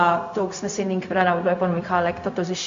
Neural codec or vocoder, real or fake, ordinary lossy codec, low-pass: codec, 16 kHz, 0.4 kbps, LongCat-Audio-Codec; fake; AAC, 64 kbps; 7.2 kHz